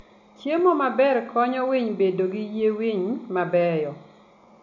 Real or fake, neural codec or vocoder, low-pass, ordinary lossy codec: real; none; 7.2 kHz; MP3, 64 kbps